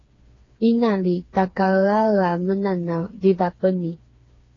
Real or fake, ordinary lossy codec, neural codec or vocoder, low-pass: fake; AAC, 32 kbps; codec, 16 kHz, 4 kbps, FreqCodec, smaller model; 7.2 kHz